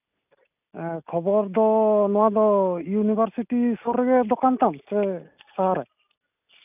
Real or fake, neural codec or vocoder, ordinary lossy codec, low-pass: real; none; none; 3.6 kHz